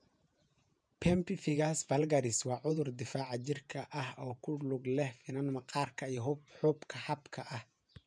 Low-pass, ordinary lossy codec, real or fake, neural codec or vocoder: 9.9 kHz; MP3, 96 kbps; fake; vocoder, 48 kHz, 128 mel bands, Vocos